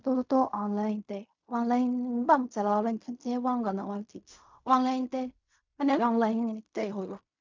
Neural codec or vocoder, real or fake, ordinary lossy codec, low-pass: codec, 16 kHz in and 24 kHz out, 0.4 kbps, LongCat-Audio-Codec, fine tuned four codebook decoder; fake; MP3, 64 kbps; 7.2 kHz